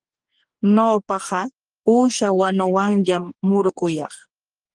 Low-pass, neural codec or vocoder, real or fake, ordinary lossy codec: 10.8 kHz; codec, 44.1 kHz, 2.6 kbps, DAC; fake; Opus, 24 kbps